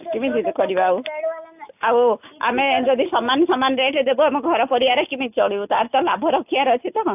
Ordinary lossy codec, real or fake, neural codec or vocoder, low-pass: AAC, 32 kbps; real; none; 3.6 kHz